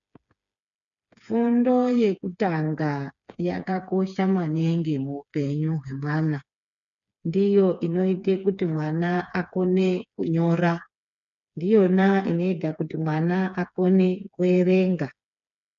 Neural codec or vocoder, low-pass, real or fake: codec, 16 kHz, 4 kbps, FreqCodec, smaller model; 7.2 kHz; fake